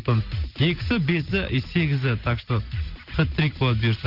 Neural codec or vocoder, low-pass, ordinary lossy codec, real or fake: none; 5.4 kHz; Opus, 24 kbps; real